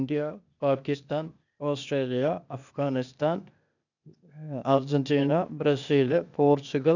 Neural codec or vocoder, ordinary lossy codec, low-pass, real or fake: codec, 16 kHz, 0.8 kbps, ZipCodec; none; 7.2 kHz; fake